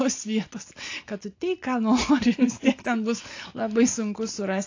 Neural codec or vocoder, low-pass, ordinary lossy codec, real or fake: none; 7.2 kHz; AAC, 48 kbps; real